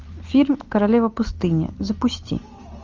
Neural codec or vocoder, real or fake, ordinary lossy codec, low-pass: none; real; Opus, 32 kbps; 7.2 kHz